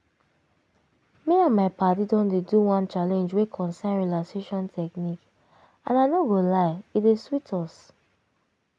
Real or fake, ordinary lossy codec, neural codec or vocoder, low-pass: real; none; none; none